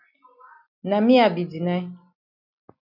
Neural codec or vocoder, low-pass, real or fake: none; 5.4 kHz; real